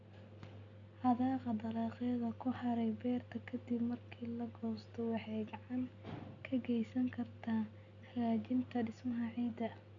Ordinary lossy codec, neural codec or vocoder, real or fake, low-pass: none; none; real; 7.2 kHz